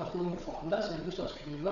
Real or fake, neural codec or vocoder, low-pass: fake; codec, 16 kHz, 4 kbps, FunCodec, trained on Chinese and English, 50 frames a second; 7.2 kHz